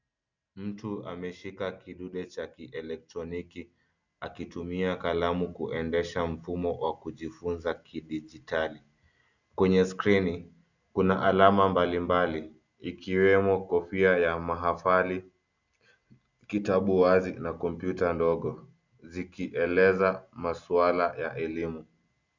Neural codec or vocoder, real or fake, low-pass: none; real; 7.2 kHz